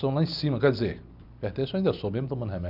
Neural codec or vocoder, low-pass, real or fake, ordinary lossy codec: none; 5.4 kHz; real; none